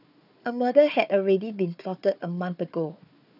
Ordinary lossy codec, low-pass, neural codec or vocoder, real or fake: none; 5.4 kHz; codec, 16 kHz, 4 kbps, FunCodec, trained on Chinese and English, 50 frames a second; fake